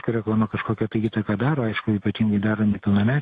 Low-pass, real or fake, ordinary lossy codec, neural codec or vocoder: 10.8 kHz; real; AAC, 48 kbps; none